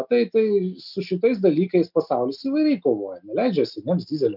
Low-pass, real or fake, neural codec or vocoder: 5.4 kHz; real; none